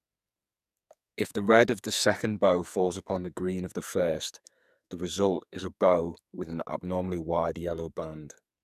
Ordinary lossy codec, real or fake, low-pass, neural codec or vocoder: none; fake; 14.4 kHz; codec, 44.1 kHz, 2.6 kbps, SNAC